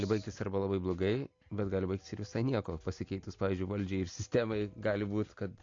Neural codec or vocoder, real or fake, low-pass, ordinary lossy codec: none; real; 7.2 kHz; AAC, 48 kbps